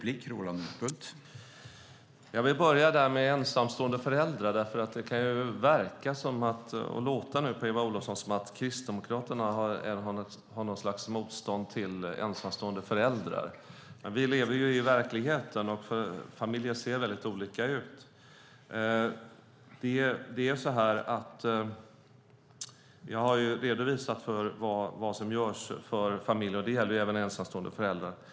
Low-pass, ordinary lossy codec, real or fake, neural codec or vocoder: none; none; real; none